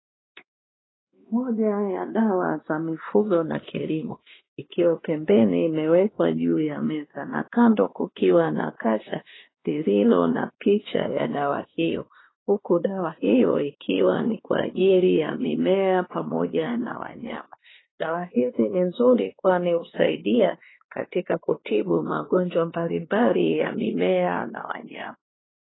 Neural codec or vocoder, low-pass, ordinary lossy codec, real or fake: codec, 16 kHz, 2 kbps, X-Codec, WavLM features, trained on Multilingual LibriSpeech; 7.2 kHz; AAC, 16 kbps; fake